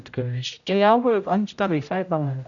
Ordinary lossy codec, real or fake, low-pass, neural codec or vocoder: none; fake; 7.2 kHz; codec, 16 kHz, 0.5 kbps, X-Codec, HuBERT features, trained on general audio